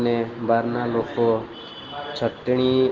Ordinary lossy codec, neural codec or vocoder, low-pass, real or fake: Opus, 16 kbps; none; 7.2 kHz; real